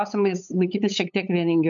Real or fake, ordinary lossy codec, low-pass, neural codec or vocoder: fake; MP3, 64 kbps; 7.2 kHz; codec, 16 kHz, 8 kbps, FunCodec, trained on LibriTTS, 25 frames a second